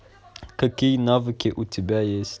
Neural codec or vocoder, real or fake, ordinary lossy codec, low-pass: none; real; none; none